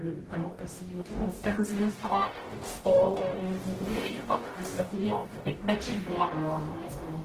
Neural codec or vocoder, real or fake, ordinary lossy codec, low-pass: codec, 44.1 kHz, 0.9 kbps, DAC; fake; Opus, 16 kbps; 14.4 kHz